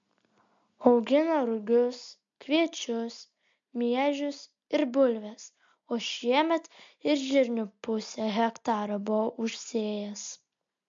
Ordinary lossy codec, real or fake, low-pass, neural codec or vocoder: MP3, 48 kbps; real; 7.2 kHz; none